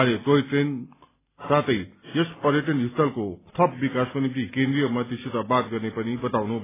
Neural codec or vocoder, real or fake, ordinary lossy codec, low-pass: none; real; AAC, 16 kbps; 3.6 kHz